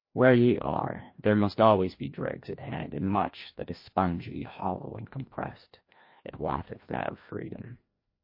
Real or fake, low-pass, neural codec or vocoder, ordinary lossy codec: fake; 5.4 kHz; codec, 16 kHz, 1 kbps, FreqCodec, larger model; MP3, 32 kbps